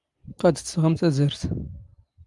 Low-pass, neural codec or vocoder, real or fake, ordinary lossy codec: 10.8 kHz; none; real; Opus, 32 kbps